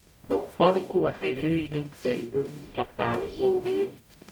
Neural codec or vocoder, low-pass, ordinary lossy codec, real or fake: codec, 44.1 kHz, 0.9 kbps, DAC; 19.8 kHz; none; fake